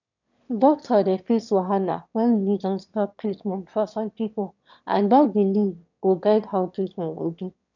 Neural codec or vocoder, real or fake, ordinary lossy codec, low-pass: autoencoder, 22.05 kHz, a latent of 192 numbers a frame, VITS, trained on one speaker; fake; none; 7.2 kHz